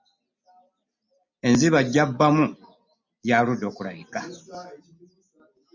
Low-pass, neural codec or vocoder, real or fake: 7.2 kHz; none; real